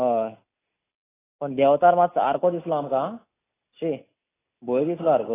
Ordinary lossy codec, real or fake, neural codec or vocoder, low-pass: AAC, 16 kbps; real; none; 3.6 kHz